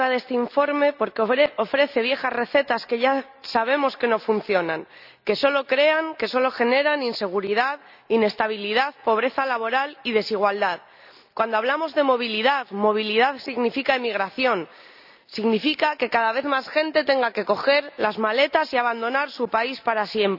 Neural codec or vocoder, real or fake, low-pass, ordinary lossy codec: none; real; 5.4 kHz; none